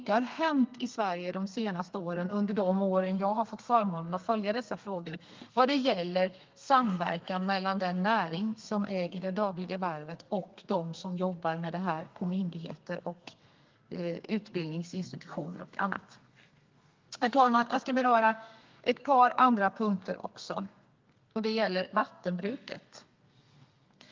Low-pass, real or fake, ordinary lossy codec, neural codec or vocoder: 7.2 kHz; fake; Opus, 32 kbps; codec, 32 kHz, 1.9 kbps, SNAC